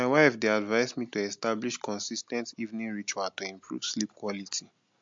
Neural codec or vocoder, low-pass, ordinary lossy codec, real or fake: none; 7.2 kHz; MP3, 48 kbps; real